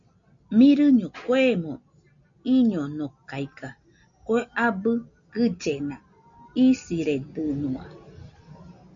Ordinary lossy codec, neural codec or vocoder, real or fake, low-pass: MP3, 64 kbps; none; real; 7.2 kHz